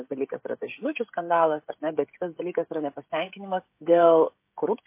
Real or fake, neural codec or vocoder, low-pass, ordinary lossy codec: fake; codec, 16 kHz, 16 kbps, FreqCodec, smaller model; 3.6 kHz; MP3, 24 kbps